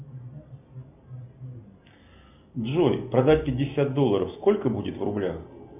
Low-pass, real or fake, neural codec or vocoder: 3.6 kHz; real; none